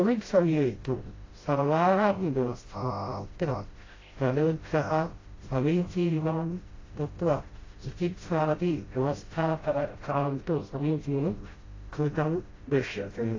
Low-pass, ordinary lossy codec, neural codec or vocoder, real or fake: 7.2 kHz; AAC, 32 kbps; codec, 16 kHz, 0.5 kbps, FreqCodec, smaller model; fake